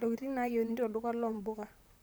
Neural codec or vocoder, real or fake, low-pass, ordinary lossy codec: vocoder, 44.1 kHz, 128 mel bands, Pupu-Vocoder; fake; none; none